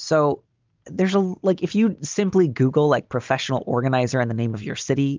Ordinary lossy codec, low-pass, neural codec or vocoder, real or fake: Opus, 24 kbps; 7.2 kHz; none; real